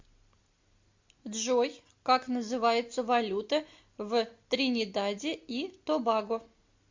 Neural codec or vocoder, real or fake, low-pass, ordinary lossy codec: none; real; 7.2 kHz; MP3, 48 kbps